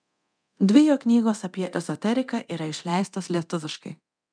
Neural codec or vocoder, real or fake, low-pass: codec, 24 kHz, 0.9 kbps, DualCodec; fake; 9.9 kHz